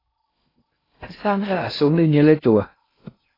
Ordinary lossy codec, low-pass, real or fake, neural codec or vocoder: AAC, 24 kbps; 5.4 kHz; fake; codec, 16 kHz in and 24 kHz out, 0.6 kbps, FocalCodec, streaming, 2048 codes